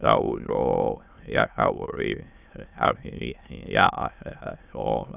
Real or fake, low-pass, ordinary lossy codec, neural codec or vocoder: fake; 3.6 kHz; none; autoencoder, 22.05 kHz, a latent of 192 numbers a frame, VITS, trained on many speakers